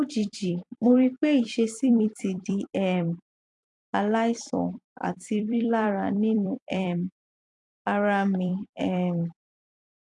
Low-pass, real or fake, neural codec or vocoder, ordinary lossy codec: 10.8 kHz; fake; vocoder, 44.1 kHz, 128 mel bands every 256 samples, BigVGAN v2; AAC, 64 kbps